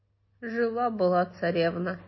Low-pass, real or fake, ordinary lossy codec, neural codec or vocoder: 7.2 kHz; real; MP3, 24 kbps; none